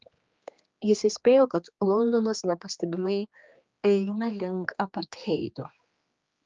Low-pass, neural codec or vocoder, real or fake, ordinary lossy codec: 7.2 kHz; codec, 16 kHz, 2 kbps, X-Codec, HuBERT features, trained on general audio; fake; Opus, 24 kbps